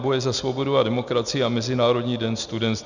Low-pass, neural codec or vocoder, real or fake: 7.2 kHz; none; real